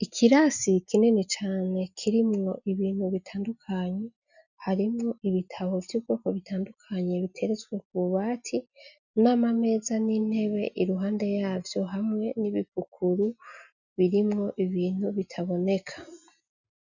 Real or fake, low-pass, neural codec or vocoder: real; 7.2 kHz; none